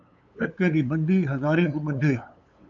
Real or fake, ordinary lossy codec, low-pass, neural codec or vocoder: fake; MP3, 96 kbps; 7.2 kHz; codec, 16 kHz, 8 kbps, FunCodec, trained on LibriTTS, 25 frames a second